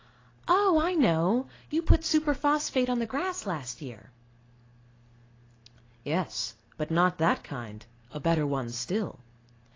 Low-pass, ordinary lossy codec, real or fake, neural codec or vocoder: 7.2 kHz; AAC, 32 kbps; real; none